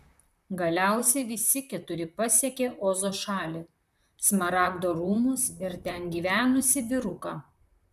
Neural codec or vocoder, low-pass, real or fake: vocoder, 44.1 kHz, 128 mel bands, Pupu-Vocoder; 14.4 kHz; fake